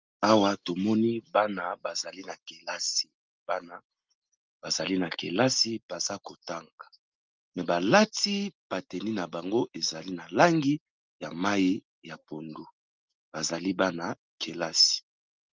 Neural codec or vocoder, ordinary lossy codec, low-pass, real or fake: none; Opus, 32 kbps; 7.2 kHz; real